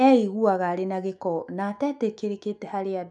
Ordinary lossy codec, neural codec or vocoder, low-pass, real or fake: none; autoencoder, 48 kHz, 128 numbers a frame, DAC-VAE, trained on Japanese speech; 10.8 kHz; fake